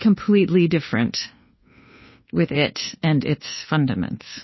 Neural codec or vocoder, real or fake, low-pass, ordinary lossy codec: codec, 24 kHz, 1.2 kbps, DualCodec; fake; 7.2 kHz; MP3, 24 kbps